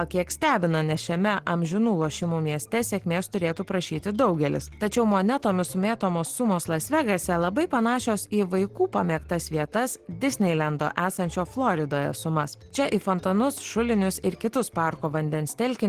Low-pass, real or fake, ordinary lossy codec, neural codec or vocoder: 14.4 kHz; real; Opus, 16 kbps; none